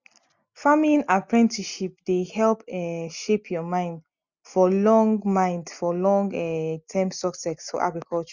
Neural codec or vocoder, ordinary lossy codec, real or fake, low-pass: none; none; real; 7.2 kHz